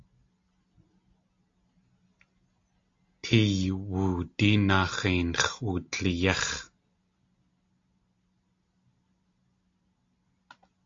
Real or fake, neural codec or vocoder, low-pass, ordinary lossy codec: real; none; 7.2 kHz; AAC, 48 kbps